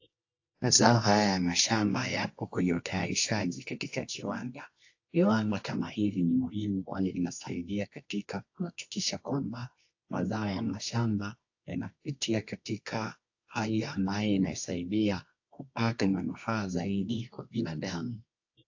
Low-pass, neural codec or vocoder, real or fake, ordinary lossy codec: 7.2 kHz; codec, 24 kHz, 0.9 kbps, WavTokenizer, medium music audio release; fake; AAC, 48 kbps